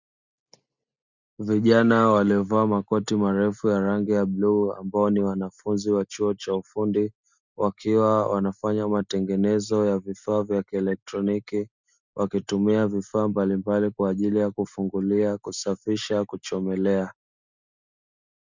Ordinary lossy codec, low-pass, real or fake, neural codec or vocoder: Opus, 64 kbps; 7.2 kHz; real; none